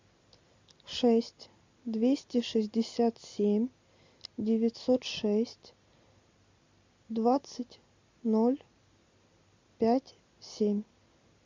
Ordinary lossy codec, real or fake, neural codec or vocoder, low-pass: MP3, 64 kbps; real; none; 7.2 kHz